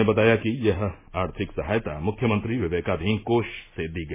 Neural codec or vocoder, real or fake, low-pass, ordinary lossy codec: none; real; 3.6 kHz; MP3, 16 kbps